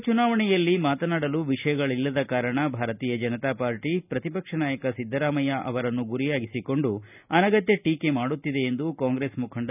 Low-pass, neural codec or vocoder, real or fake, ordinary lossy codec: 3.6 kHz; none; real; none